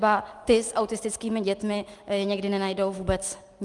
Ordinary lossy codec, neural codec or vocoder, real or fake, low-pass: Opus, 32 kbps; none; real; 10.8 kHz